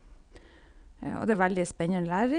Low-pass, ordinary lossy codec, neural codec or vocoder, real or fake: 9.9 kHz; none; vocoder, 22.05 kHz, 80 mel bands, WaveNeXt; fake